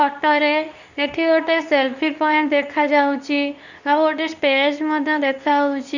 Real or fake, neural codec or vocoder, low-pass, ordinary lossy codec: fake; codec, 24 kHz, 0.9 kbps, WavTokenizer, medium speech release version 2; 7.2 kHz; none